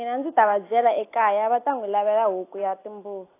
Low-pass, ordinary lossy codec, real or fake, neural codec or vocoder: 3.6 kHz; AAC, 24 kbps; real; none